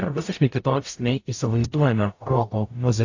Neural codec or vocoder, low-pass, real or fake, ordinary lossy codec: codec, 44.1 kHz, 0.9 kbps, DAC; 7.2 kHz; fake; AAC, 48 kbps